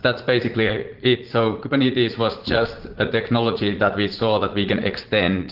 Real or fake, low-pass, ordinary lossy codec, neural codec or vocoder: fake; 5.4 kHz; Opus, 16 kbps; vocoder, 44.1 kHz, 80 mel bands, Vocos